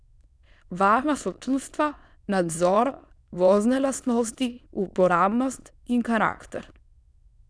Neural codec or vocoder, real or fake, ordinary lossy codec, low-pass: autoencoder, 22.05 kHz, a latent of 192 numbers a frame, VITS, trained on many speakers; fake; none; none